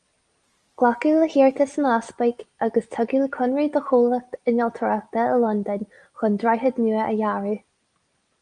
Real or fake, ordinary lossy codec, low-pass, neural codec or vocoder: real; Opus, 32 kbps; 9.9 kHz; none